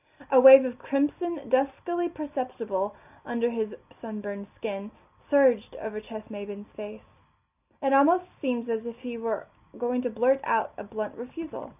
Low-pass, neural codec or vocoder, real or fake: 3.6 kHz; none; real